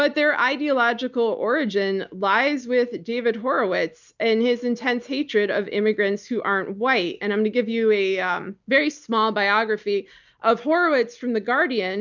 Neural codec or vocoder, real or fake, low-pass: none; real; 7.2 kHz